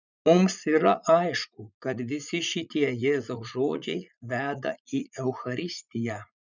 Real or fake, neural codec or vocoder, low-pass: fake; vocoder, 44.1 kHz, 80 mel bands, Vocos; 7.2 kHz